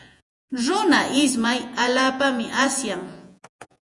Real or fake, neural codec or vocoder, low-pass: fake; vocoder, 48 kHz, 128 mel bands, Vocos; 10.8 kHz